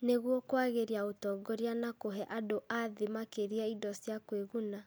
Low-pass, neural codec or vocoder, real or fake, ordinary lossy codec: none; none; real; none